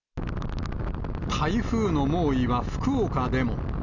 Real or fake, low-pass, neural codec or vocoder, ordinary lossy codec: real; 7.2 kHz; none; none